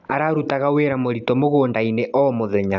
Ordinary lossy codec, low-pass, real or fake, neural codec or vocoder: none; 7.2 kHz; real; none